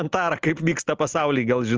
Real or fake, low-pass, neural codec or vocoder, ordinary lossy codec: real; 7.2 kHz; none; Opus, 24 kbps